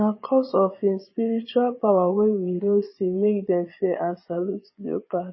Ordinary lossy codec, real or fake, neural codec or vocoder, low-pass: MP3, 24 kbps; fake; vocoder, 22.05 kHz, 80 mel bands, Vocos; 7.2 kHz